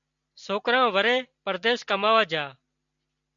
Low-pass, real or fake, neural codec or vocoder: 7.2 kHz; real; none